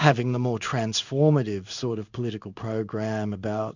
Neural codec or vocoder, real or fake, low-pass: codec, 16 kHz in and 24 kHz out, 1 kbps, XY-Tokenizer; fake; 7.2 kHz